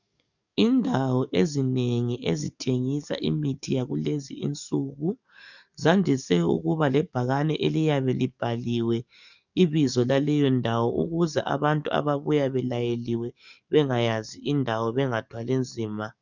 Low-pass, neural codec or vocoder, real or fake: 7.2 kHz; codec, 44.1 kHz, 7.8 kbps, DAC; fake